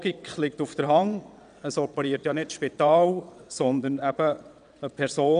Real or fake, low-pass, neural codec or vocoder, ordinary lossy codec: fake; 9.9 kHz; vocoder, 22.05 kHz, 80 mel bands, WaveNeXt; none